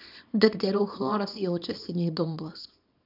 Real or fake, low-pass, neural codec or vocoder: fake; 5.4 kHz; codec, 24 kHz, 0.9 kbps, WavTokenizer, small release